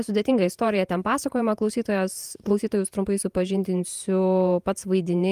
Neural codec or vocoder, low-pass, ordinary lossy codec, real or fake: vocoder, 48 kHz, 128 mel bands, Vocos; 14.4 kHz; Opus, 32 kbps; fake